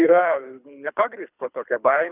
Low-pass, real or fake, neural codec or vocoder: 3.6 kHz; fake; codec, 24 kHz, 3 kbps, HILCodec